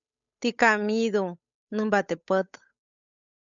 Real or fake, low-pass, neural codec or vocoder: fake; 7.2 kHz; codec, 16 kHz, 8 kbps, FunCodec, trained on Chinese and English, 25 frames a second